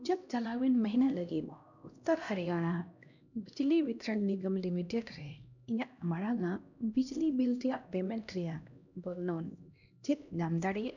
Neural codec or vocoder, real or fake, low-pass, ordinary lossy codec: codec, 16 kHz, 1 kbps, X-Codec, HuBERT features, trained on LibriSpeech; fake; 7.2 kHz; none